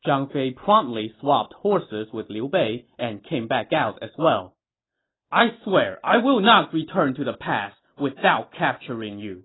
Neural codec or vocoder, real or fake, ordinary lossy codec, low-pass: none; real; AAC, 16 kbps; 7.2 kHz